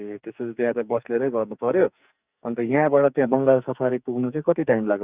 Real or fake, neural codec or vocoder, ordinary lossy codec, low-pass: fake; codec, 44.1 kHz, 2.6 kbps, SNAC; Opus, 64 kbps; 3.6 kHz